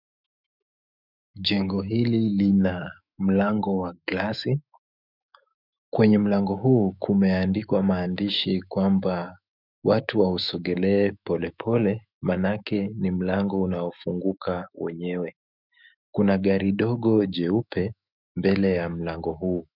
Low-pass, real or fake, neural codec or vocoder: 5.4 kHz; fake; autoencoder, 48 kHz, 128 numbers a frame, DAC-VAE, trained on Japanese speech